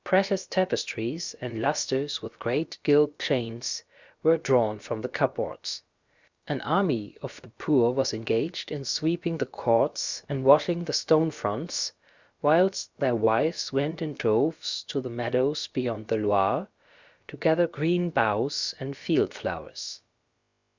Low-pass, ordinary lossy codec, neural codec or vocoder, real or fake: 7.2 kHz; Opus, 64 kbps; codec, 16 kHz, about 1 kbps, DyCAST, with the encoder's durations; fake